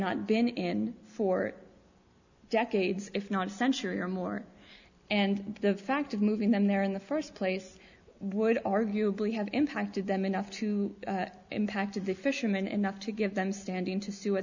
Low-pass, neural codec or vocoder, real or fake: 7.2 kHz; none; real